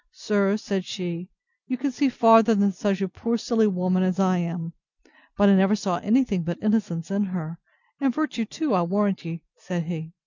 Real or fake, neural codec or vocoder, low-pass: real; none; 7.2 kHz